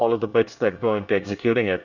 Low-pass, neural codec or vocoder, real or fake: 7.2 kHz; codec, 24 kHz, 1 kbps, SNAC; fake